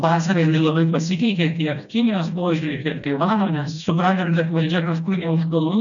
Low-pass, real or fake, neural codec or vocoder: 7.2 kHz; fake; codec, 16 kHz, 1 kbps, FreqCodec, smaller model